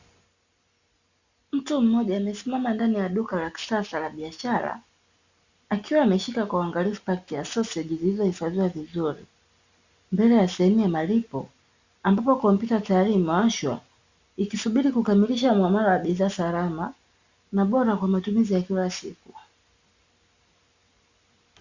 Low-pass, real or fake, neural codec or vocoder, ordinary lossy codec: 7.2 kHz; real; none; Opus, 64 kbps